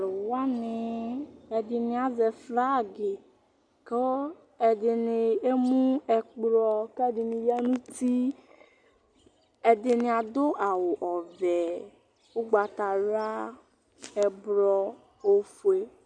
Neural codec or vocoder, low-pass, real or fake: none; 9.9 kHz; real